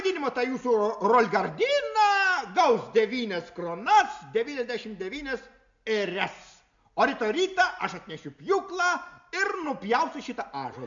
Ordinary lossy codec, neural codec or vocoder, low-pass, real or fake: MP3, 64 kbps; none; 7.2 kHz; real